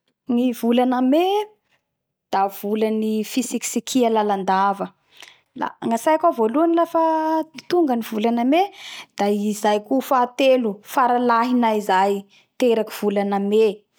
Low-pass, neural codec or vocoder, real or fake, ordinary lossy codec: none; none; real; none